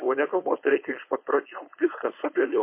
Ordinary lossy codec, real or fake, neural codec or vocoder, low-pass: MP3, 24 kbps; fake; codec, 16 kHz, 4.8 kbps, FACodec; 3.6 kHz